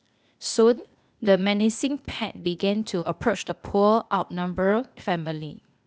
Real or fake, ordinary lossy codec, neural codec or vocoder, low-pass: fake; none; codec, 16 kHz, 0.8 kbps, ZipCodec; none